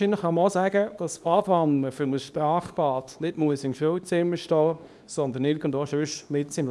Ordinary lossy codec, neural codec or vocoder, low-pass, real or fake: none; codec, 24 kHz, 0.9 kbps, WavTokenizer, small release; none; fake